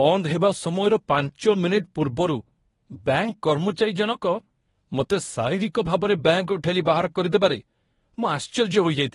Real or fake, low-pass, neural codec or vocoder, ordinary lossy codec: fake; 10.8 kHz; codec, 24 kHz, 0.9 kbps, WavTokenizer, medium speech release version 1; AAC, 32 kbps